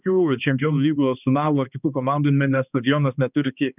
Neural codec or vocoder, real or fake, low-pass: codec, 16 kHz, 2 kbps, X-Codec, HuBERT features, trained on balanced general audio; fake; 3.6 kHz